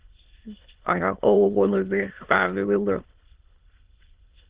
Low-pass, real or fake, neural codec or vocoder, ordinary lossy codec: 3.6 kHz; fake; autoencoder, 22.05 kHz, a latent of 192 numbers a frame, VITS, trained on many speakers; Opus, 16 kbps